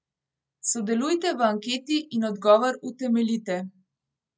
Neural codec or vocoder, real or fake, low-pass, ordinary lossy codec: none; real; none; none